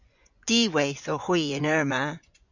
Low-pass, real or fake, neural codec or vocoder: 7.2 kHz; fake; vocoder, 24 kHz, 100 mel bands, Vocos